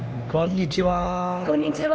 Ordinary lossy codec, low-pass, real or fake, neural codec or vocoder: none; none; fake; codec, 16 kHz, 2 kbps, X-Codec, HuBERT features, trained on LibriSpeech